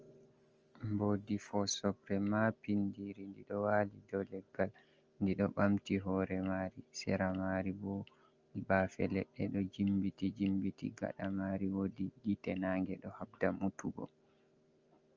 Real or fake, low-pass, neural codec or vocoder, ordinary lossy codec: real; 7.2 kHz; none; Opus, 24 kbps